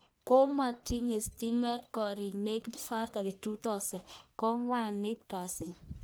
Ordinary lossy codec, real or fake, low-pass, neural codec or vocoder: none; fake; none; codec, 44.1 kHz, 1.7 kbps, Pupu-Codec